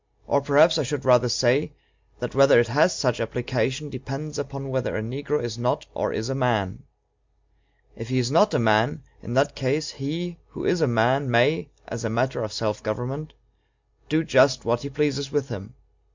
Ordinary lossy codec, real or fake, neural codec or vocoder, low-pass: MP3, 64 kbps; real; none; 7.2 kHz